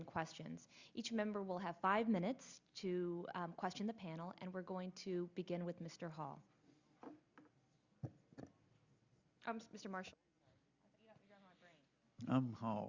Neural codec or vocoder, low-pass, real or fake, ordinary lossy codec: none; 7.2 kHz; real; Opus, 64 kbps